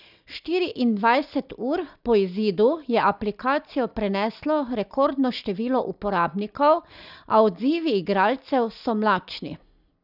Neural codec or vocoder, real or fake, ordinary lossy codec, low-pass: none; real; none; 5.4 kHz